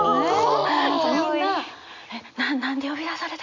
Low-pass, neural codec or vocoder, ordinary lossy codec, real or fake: 7.2 kHz; none; none; real